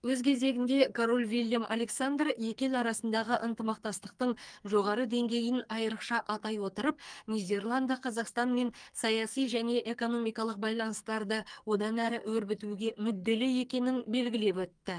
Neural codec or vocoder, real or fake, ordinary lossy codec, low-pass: codec, 44.1 kHz, 2.6 kbps, SNAC; fake; Opus, 32 kbps; 9.9 kHz